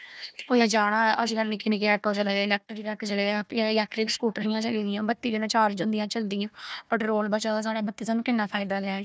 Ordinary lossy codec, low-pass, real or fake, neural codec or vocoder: none; none; fake; codec, 16 kHz, 1 kbps, FunCodec, trained on Chinese and English, 50 frames a second